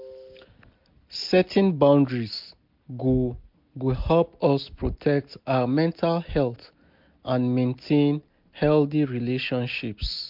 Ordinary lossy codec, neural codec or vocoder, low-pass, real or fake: MP3, 48 kbps; none; 5.4 kHz; real